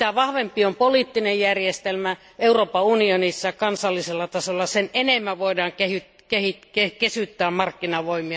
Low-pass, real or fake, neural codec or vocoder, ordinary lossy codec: none; real; none; none